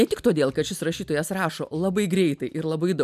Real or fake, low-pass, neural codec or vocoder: real; 14.4 kHz; none